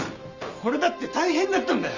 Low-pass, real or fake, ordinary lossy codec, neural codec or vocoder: 7.2 kHz; real; none; none